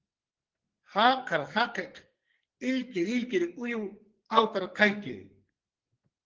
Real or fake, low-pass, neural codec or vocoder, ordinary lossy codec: fake; 7.2 kHz; codec, 44.1 kHz, 2.6 kbps, SNAC; Opus, 16 kbps